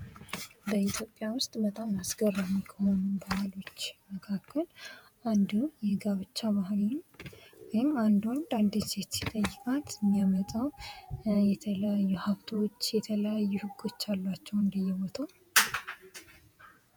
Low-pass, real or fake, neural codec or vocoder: 19.8 kHz; fake; vocoder, 48 kHz, 128 mel bands, Vocos